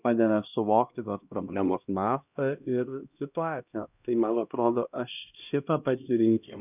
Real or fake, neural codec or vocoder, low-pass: fake; codec, 16 kHz, 1 kbps, X-Codec, HuBERT features, trained on LibriSpeech; 3.6 kHz